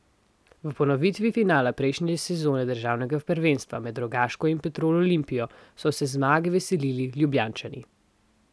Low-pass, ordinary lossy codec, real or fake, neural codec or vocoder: none; none; real; none